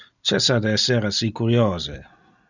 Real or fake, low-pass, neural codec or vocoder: real; 7.2 kHz; none